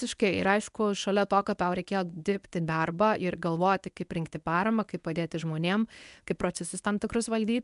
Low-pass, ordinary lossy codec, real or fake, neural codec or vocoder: 10.8 kHz; MP3, 96 kbps; fake; codec, 24 kHz, 0.9 kbps, WavTokenizer, medium speech release version 1